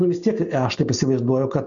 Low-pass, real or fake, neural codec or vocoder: 7.2 kHz; real; none